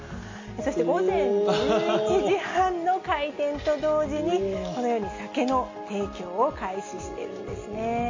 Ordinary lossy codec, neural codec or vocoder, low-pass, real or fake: MP3, 32 kbps; none; 7.2 kHz; real